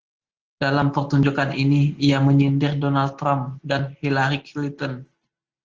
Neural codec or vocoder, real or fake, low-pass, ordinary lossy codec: none; real; 7.2 kHz; Opus, 16 kbps